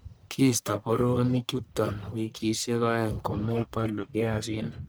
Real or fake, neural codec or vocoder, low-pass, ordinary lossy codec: fake; codec, 44.1 kHz, 1.7 kbps, Pupu-Codec; none; none